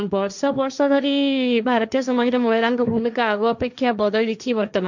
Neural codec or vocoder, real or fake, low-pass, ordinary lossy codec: codec, 16 kHz, 1.1 kbps, Voila-Tokenizer; fake; 7.2 kHz; none